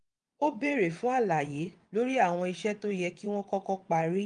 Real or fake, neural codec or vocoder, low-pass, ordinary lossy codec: fake; vocoder, 22.05 kHz, 80 mel bands, WaveNeXt; 9.9 kHz; Opus, 32 kbps